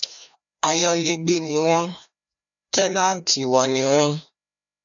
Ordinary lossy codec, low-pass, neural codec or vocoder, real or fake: MP3, 96 kbps; 7.2 kHz; codec, 16 kHz, 1 kbps, FreqCodec, larger model; fake